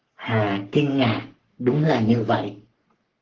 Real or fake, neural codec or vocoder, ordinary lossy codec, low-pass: fake; codec, 44.1 kHz, 3.4 kbps, Pupu-Codec; Opus, 16 kbps; 7.2 kHz